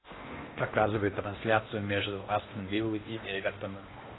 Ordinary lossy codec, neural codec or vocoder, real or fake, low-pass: AAC, 16 kbps; codec, 16 kHz in and 24 kHz out, 0.8 kbps, FocalCodec, streaming, 65536 codes; fake; 7.2 kHz